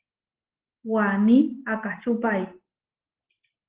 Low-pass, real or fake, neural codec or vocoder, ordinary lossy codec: 3.6 kHz; real; none; Opus, 16 kbps